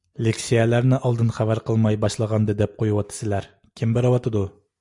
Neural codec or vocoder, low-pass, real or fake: none; 10.8 kHz; real